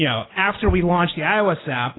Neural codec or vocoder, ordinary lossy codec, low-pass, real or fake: none; AAC, 16 kbps; 7.2 kHz; real